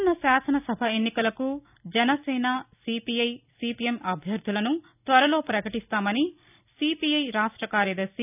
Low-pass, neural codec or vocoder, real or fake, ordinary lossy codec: 3.6 kHz; none; real; none